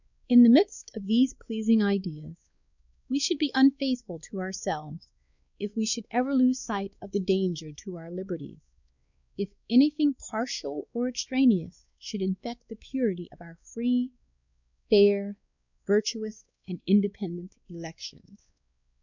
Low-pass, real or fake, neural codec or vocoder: 7.2 kHz; fake; codec, 16 kHz, 2 kbps, X-Codec, WavLM features, trained on Multilingual LibriSpeech